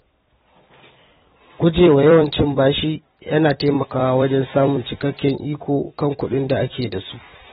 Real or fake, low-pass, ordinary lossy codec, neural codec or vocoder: fake; 19.8 kHz; AAC, 16 kbps; vocoder, 44.1 kHz, 128 mel bands every 256 samples, BigVGAN v2